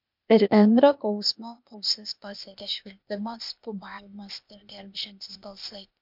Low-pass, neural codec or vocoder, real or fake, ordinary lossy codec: 5.4 kHz; codec, 16 kHz, 0.8 kbps, ZipCodec; fake; MP3, 48 kbps